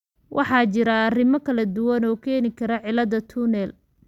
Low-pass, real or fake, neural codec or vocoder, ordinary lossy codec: 19.8 kHz; real; none; none